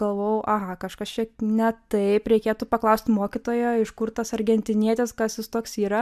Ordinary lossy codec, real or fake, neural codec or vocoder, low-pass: MP3, 96 kbps; real; none; 14.4 kHz